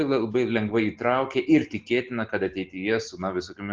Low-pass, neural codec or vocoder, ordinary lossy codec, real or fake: 7.2 kHz; none; Opus, 16 kbps; real